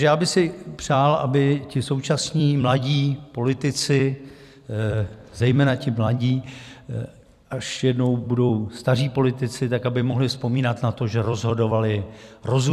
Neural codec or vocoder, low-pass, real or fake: vocoder, 44.1 kHz, 128 mel bands every 256 samples, BigVGAN v2; 14.4 kHz; fake